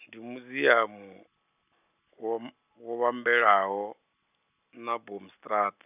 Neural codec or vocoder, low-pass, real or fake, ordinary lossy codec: none; 3.6 kHz; real; none